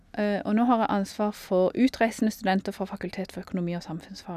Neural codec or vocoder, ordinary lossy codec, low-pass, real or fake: none; none; 14.4 kHz; real